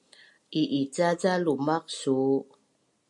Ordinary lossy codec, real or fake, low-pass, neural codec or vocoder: MP3, 64 kbps; real; 10.8 kHz; none